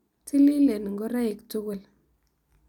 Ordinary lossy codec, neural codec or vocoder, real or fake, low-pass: none; none; real; 19.8 kHz